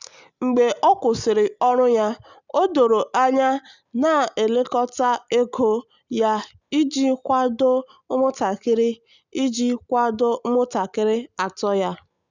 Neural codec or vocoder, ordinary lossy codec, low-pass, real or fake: none; none; 7.2 kHz; real